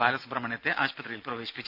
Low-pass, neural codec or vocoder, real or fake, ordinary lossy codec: 5.4 kHz; none; real; none